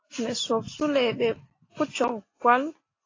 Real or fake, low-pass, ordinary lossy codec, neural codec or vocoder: real; 7.2 kHz; AAC, 32 kbps; none